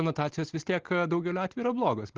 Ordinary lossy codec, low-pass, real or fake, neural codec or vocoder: Opus, 16 kbps; 7.2 kHz; real; none